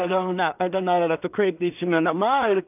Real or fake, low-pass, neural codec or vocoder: fake; 3.6 kHz; codec, 16 kHz in and 24 kHz out, 0.4 kbps, LongCat-Audio-Codec, two codebook decoder